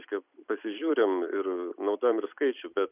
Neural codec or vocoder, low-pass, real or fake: vocoder, 44.1 kHz, 128 mel bands every 256 samples, BigVGAN v2; 3.6 kHz; fake